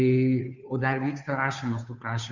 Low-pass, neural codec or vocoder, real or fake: 7.2 kHz; codec, 16 kHz, 2 kbps, FunCodec, trained on Chinese and English, 25 frames a second; fake